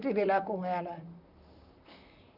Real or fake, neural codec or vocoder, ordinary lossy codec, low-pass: fake; vocoder, 44.1 kHz, 128 mel bands, Pupu-Vocoder; none; 5.4 kHz